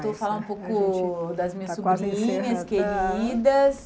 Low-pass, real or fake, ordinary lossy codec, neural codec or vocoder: none; real; none; none